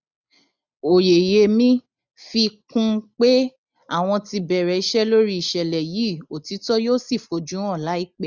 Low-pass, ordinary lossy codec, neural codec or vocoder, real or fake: 7.2 kHz; none; none; real